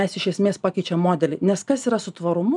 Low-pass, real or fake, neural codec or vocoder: 10.8 kHz; real; none